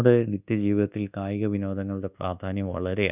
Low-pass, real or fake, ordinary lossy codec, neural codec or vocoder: 3.6 kHz; fake; none; codec, 24 kHz, 1.2 kbps, DualCodec